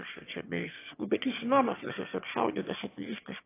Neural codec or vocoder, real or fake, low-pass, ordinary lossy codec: autoencoder, 22.05 kHz, a latent of 192 numbers a frame, VITS, trained on one speaker; fake; 3.6 kHz; AAC, 24 kbps